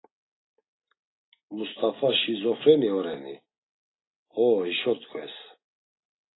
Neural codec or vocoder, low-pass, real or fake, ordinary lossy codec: none; 7.2 kHz; real; AAC, 16 kbps